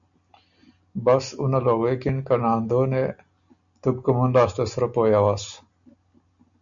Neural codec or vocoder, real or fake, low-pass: none; real; 7.2 kHz